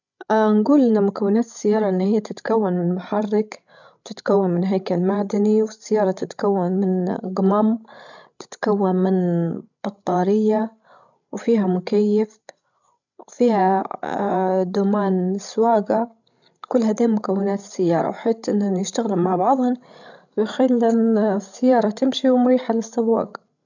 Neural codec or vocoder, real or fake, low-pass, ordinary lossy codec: codec, 16 kHz, 16 kbps, FreqCodec, larger model; fake; 7.2 kHz; none